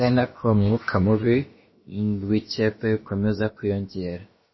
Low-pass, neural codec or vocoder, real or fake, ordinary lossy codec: 7.2 kHz; codec, 16 kHz, about 1 kbps, DyCAST, with the encoder's durations; fake; MP3, 24 kbps